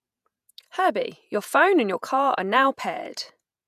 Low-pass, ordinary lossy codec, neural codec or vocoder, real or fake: 14.4 kHz; none; vocoder, 48 kHz, 128 mel bands, Vocos; fake